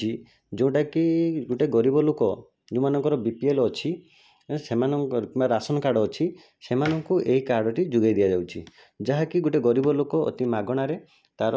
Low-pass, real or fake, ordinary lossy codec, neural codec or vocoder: none; real; none; none